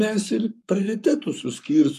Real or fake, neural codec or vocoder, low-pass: fake; codec, 44.1 kHz, 7.8 kbps, Pupu-Codec; 14.4 kHz